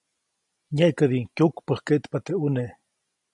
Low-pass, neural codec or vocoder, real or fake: 10.8 kHz; none; real